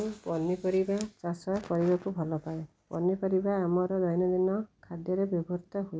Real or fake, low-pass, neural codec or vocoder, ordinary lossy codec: real; none; none; none